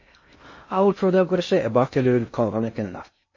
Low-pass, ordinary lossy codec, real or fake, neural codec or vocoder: 7.2 kHz; MP3, 32 kbps; fake; codec, 16 kHz in and 24 kHz out, 0.6 kbps, FocalCodec, streaming, 2048 codes